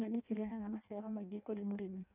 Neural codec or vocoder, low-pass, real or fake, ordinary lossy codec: codec, 16 kHz in and 24 kHz out, 0.6 kbps, FireRedTTS-2 codec; 3.6 kHz; fake; none